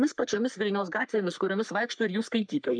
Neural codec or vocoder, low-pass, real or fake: codec, 44.1 kHz, 3.4 kbps, Pupu-Codec; 9.9 kHz; fake